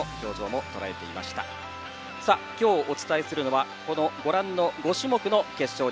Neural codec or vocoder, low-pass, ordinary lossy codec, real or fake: none; none; none; real